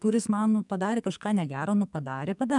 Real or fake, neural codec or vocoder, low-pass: fake; codec, 44.1 kHz, 2.6 kbps, SNAC; 10.8 kHz